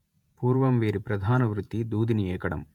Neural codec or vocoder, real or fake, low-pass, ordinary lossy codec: vocoder, 48 kHz, 128 mel bands, Vocos; fake; 19.8 kHz; none